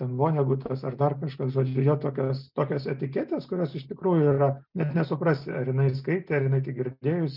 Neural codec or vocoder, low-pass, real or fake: none; 5.4 kHz; real